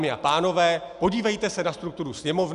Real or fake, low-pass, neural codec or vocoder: real; 10.8 kHz; none